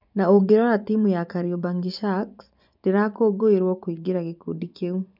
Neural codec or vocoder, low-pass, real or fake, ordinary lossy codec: vocoder, 24 kHz, 100 mel bands, Vocos; 5.4 kHz; fake; none